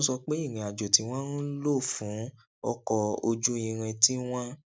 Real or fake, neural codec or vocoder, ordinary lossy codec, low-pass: real; none; none; none